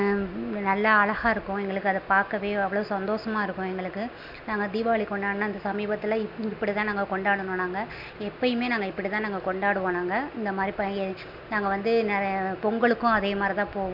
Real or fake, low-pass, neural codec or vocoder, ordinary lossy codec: real; 5.4 kHz; none; MP3, 48 kbps